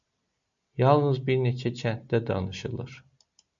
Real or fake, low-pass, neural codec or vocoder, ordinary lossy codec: real; 7.2 kHz; none; MP3, 64 kbps